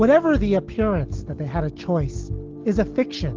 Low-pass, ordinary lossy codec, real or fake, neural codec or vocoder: 7.2 kHz; Opus, 16 kbps; real; none